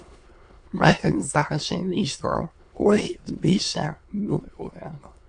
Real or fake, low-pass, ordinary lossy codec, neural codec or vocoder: fake; 9.9 kHz; AAC, 64 kbps; autoencoder, 22.05 kHz, a latent of 192 numbers a frame, VITS, trained on many speakers